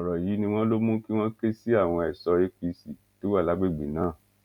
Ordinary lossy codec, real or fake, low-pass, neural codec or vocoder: none; real; 19.8 kHz; none